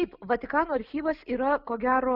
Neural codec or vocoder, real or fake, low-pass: none; real; 5.4 kHz